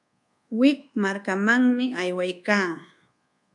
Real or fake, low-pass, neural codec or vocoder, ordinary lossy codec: fake; 10.8 kHz; codec, 24 kHz, 1.2 kbps, DualCodec; MP3, 96 kbps